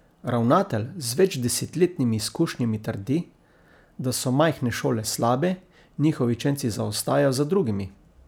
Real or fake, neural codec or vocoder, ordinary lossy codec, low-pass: real; none; none; none